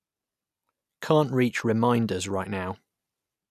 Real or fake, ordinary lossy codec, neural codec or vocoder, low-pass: real; none; none; 14.4 kHz